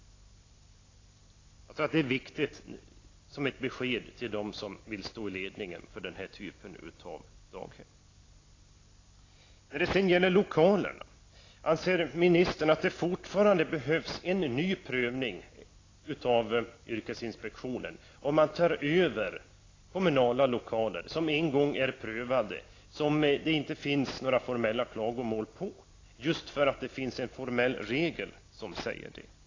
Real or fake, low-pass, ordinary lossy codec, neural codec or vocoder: real; 7.2 kHz; AAC, 32 kbps; none